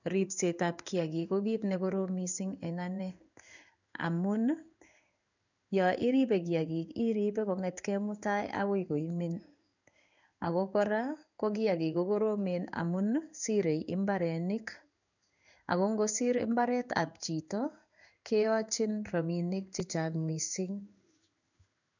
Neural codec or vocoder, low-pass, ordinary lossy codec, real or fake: codec, 16 kHz in and 24 kHz out, 1 kbps, XY-Tokenizer; 7.2 kHz; none; fake